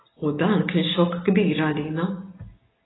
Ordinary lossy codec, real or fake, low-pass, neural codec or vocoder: AAC, 16 kbps; real; 7.2 kHz; none